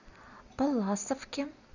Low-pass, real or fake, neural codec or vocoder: 7.2 kHz; real; none